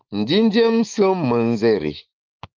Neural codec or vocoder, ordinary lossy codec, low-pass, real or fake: autoencoder, 48 kHz, 128 numbers a frame, DAC-VAE, trained on Japanese speech; Opus, 24 kbps; 7.2 kHz; fake